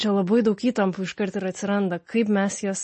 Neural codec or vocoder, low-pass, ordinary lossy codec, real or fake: none; 10.8 kHz; MP3, 32 kbps; real